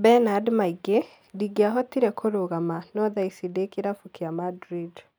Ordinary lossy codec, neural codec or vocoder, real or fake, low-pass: none; none; real; none